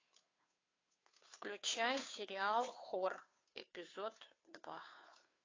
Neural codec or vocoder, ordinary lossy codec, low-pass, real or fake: codec, 16 kHz, 2 kbps, FunCodec, trained on Chinese and English, 25 frames a second; AAC, 32 kbps; 7.2 kHz; fake